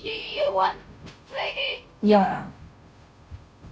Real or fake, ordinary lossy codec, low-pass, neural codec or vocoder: fake; none; none; codec, 16 kHz, 0.5 kbps, FunCodec, trained on Chinese and English, 25 frames a second